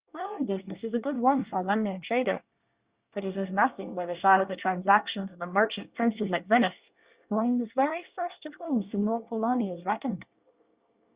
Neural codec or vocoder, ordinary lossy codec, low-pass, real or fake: codec, 24 kHz, 1 kbps, SNAC; Opus, 64 kbps; 3.6 kHz; fake